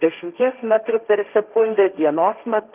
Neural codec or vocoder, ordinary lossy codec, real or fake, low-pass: codec, 16 kHz, 1.1 kbps, Voila-Tokenizer; Opus, 24 kbps; fake; 3.6 kHz